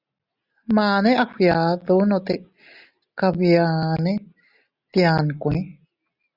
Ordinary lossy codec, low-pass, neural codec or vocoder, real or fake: Opus, 64 kbps; 5.4 kHz; none; real